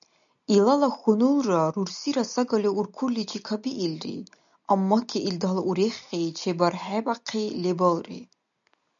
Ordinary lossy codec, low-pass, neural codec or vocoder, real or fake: MP3, 96 kbps; 7.2 kHz; none; real